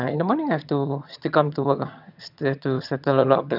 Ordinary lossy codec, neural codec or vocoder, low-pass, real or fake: none; vocoder, 22.05 kHz, 80 mel bands, HiFi-GAN; 5.4 kHz; fake